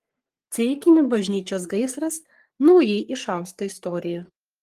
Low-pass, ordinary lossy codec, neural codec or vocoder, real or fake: 14.4 kHz; Opus, 24 kbps; codec, 44.1 kHz, 3.4 kbps, Pupu-Codec; fake